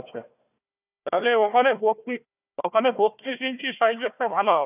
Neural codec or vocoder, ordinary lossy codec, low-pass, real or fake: codec, 16 kHz, 1 kbps, FunCodec, trained on Chinese and English, 50 frames a second; none; 3.6 kHz; fake